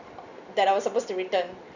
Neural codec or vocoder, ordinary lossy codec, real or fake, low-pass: none; none; real; 7.2 kHz